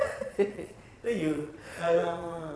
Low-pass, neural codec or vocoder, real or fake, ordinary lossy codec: none; vocoder, 22.05 kHz, 80 mel bands, Vocos; fake; none